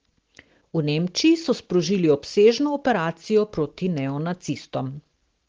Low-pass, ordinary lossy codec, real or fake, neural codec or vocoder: 7.2 kHz; Opus, 16 kbps; real; none